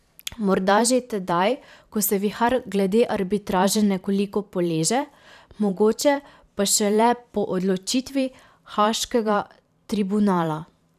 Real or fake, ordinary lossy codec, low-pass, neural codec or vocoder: fake; none; 14.4 kHz; vocoder, 44.1 kHz, 128 mel bands every 512 samples, BigVGAN v2